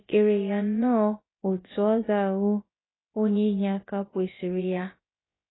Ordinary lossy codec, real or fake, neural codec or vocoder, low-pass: AAC, 16 kbps; fake; codec, 16 kHz, about 1 kbps, DyCAST, with the encoder's durations; 7.2 kHz